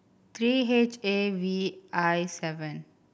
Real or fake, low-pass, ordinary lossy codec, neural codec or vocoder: real; none; none; none